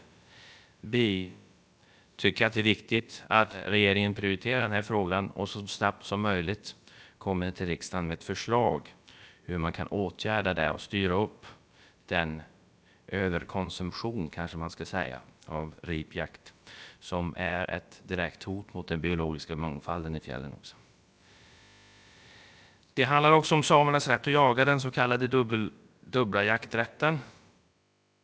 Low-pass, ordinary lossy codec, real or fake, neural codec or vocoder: none; none; fake; codec, 16 kHz, about 1 kbps, DyCAST, with the encoder's durations